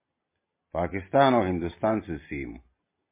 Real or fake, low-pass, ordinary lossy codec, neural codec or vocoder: real; 3.6 kHz; MP3, 24 kbps; none